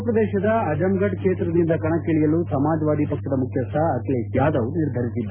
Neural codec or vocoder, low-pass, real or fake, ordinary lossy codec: none; 3.6 kHz; real; Opus, 64 kbps